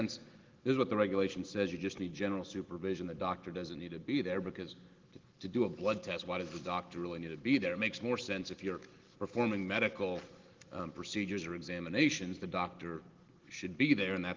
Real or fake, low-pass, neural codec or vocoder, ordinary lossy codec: real; 7.2 kHz; none; Opus, 16 kbps